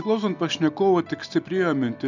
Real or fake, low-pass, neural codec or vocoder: real; 7.2 kHz; none